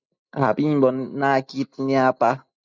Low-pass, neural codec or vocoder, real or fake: 7.2 kHz; none; real